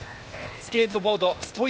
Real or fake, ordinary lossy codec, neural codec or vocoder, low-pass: fake; none; codec, 16 kHz, 0.8 kbps, ZipCodec; none